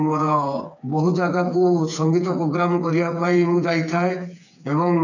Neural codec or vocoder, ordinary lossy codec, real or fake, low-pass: codec, 16 kHz, 4 kbps, FreqCodec, smaller model; none; fake; 7.2 kHz